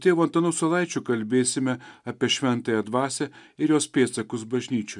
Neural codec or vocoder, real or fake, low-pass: none; real; 10.8 kHz